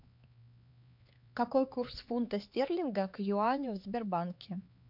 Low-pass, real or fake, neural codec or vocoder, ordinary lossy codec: 5.4 kHz; fake; codec, 16 kHz, 4 kbps, X-Codec, HuBERT features, trained on LibriSpeech; MP3, 48 kbps